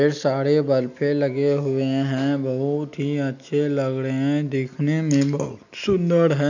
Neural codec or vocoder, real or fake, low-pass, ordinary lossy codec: none; real; 7.2 kHz; none